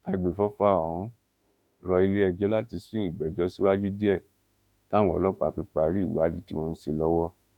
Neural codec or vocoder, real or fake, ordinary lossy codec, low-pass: autoencoder, 48 kHz, 32 numbers a frame, DAC-VAE, trained on Japanese speech; fake; none; 19.8 kHz